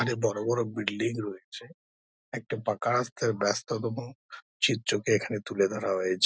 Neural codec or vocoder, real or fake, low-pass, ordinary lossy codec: none; real; none; none